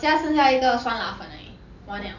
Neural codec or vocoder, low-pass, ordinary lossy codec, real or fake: none; 7.2 kHz; none; real